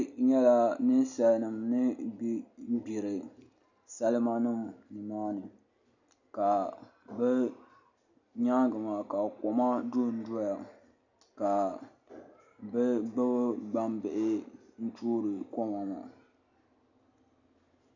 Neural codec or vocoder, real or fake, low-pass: none; real; 7.2 kHz